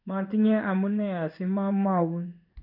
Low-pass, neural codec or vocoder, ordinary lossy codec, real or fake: 5.4 kHz; codec, 16 kHz in and 24 kHz out, 1 kbps, XY-Tokenizer; none; fake